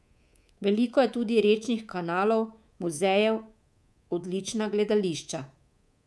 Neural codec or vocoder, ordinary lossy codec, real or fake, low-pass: codec, 24 kHz, 3.1 kbps, DualCodec; none; fake; none